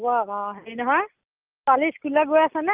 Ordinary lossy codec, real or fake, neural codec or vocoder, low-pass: Opus, 32 kbps; real; none; 3.6 kHz